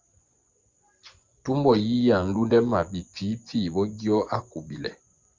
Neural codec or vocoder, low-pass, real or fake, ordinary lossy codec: vocoder, 24 kHz, 100 mel bands, Vocos; 7.2 kHz; fake; Opus, 24 kbps